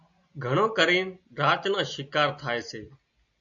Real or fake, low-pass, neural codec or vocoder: real; 7.2 kHz; none